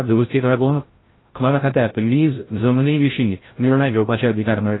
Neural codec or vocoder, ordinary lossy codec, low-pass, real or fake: codec, 16 kHz, 0.5 kbps, FreqCodec, larger model; AAC, 16 kbps; 7.2 kHz; fake